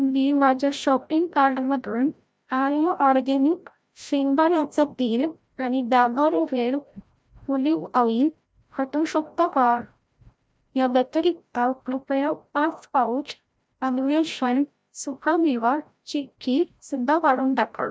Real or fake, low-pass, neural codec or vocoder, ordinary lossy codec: fake; none; codec, 16 kHz, 0.5 kbps, FreqCodec, larger model; none